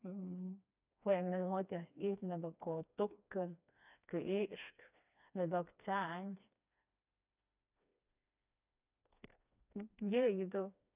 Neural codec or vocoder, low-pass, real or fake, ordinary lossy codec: codec, 16 kHz, 2 kbps, FreqCodec, smaller model; 3.6 kHz; fake; none